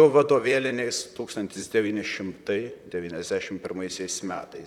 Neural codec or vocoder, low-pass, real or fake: vocoder, 44.1 kHz, 128 mel bands, Pupu-Vocoder; 19.8 kHz; fake